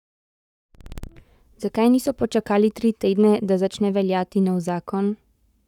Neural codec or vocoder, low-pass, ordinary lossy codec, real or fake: codec, 44.1 kHz, 7.8 kbps, Pupu-Codec; 19.8 kHz; none; fake